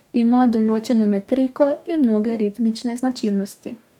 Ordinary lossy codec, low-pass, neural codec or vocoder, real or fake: none; 19.8 kHz; codec, 44.1 kHz, 2.6 kbps, DAC; fake